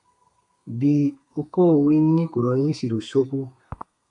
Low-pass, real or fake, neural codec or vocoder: 10.8 kHz; fake; codec, 32 kHz, 1.9 kbps, SNAC